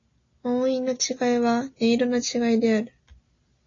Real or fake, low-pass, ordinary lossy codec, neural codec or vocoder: real; 7.2 kHz; AAC, 32 kbps; none